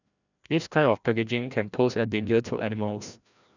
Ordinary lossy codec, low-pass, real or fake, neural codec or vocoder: none; 7.2 kHz; fake; codec, 16 kHz, 1 kbps, FreqCodec, larger model